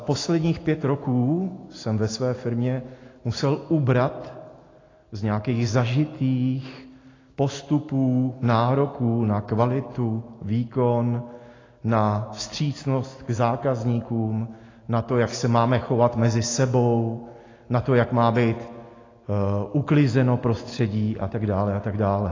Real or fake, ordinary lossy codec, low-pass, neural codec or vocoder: real; AAC, 32 kbps; 7.2 kHz; none